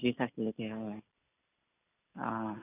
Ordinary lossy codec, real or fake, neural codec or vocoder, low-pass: none; real; none; 3.6 kHz